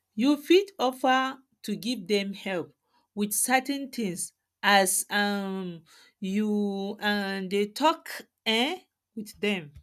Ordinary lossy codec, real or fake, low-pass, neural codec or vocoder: none; real; 14.4 kHz; none